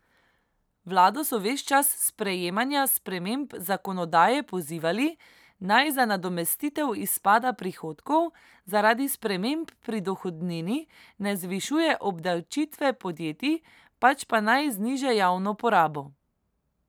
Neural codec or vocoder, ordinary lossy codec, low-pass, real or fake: none; none; none; real